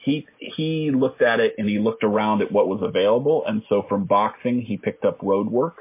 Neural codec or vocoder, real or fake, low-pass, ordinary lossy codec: none; real; 3.6 kHz; MP3, 24 kbps